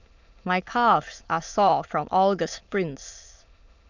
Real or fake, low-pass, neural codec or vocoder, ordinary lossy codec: fake; 7.2 kHz; autoencoder, 22.05 kHz, a latent of 192 numbers a frame, VITS, trained on many speakers; none